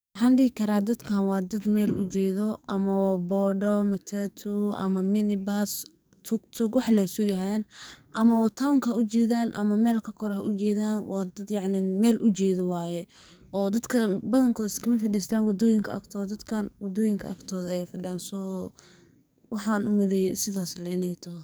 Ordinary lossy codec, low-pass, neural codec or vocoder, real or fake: none; none; codec, 44.1 kHz, 2.6 kbps, SNAC; fake